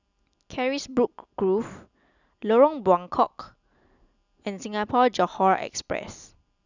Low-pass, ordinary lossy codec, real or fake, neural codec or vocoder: 7.2 kHz; none; real; none